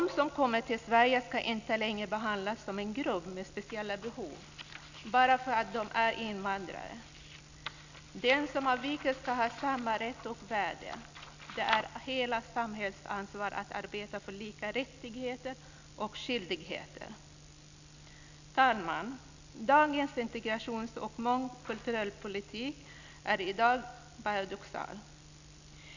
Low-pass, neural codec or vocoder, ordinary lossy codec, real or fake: 7.2 kHz; none; none; real